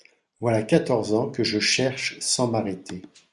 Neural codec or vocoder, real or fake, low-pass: none; real; 10.8 kHz